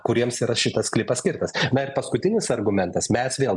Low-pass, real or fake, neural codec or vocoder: 10.8 kHz; real; none